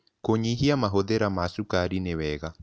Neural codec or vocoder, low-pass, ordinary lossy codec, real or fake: none; none; none; real